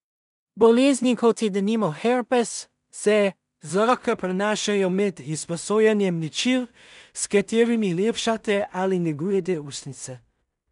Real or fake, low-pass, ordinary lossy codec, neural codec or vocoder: fake; 10.8 kHz; none; codec, 16 kHz in and 24 kHz out, 0.4 kbps, LongCat-Audio-Codec, two codebook decoder